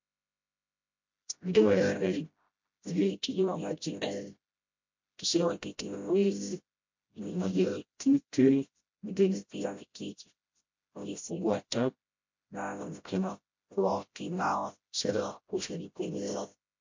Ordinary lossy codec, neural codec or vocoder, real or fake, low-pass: MP3, 48 kbps; codec, 16 kHz, 0.5 kbps, FreqCodec, smaller model; fake; 7.2 kHz